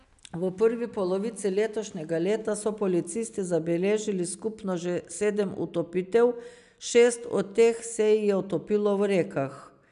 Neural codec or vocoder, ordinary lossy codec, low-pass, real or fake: none; none; 10.8 kHz; real